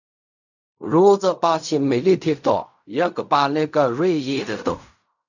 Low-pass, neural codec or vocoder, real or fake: 7.2 kHz; codec, 16 kHz in and 24 kHz out, 0.4 kbps, LongCat-Audio-Codec, fine tuned four codebook decoder; fake